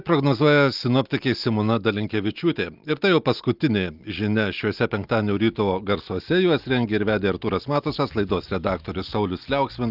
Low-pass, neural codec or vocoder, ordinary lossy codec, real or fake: 5.4 kHz; none; Opus, 24 kbps; real